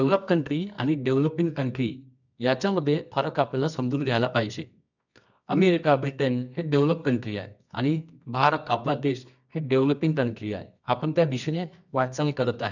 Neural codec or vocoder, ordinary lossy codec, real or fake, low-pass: codec, 24 kHz, 0.9 kbps, WavTokenizer, medium music audio release; none; fake; 7.2 kHz